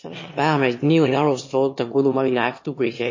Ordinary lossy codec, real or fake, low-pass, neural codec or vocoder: MP3, 32 kbps; fake; 7.2 kHz; autoencoder, 22.05 kHz, a latent of 192 numbers a frame, VITS, trained on one speaker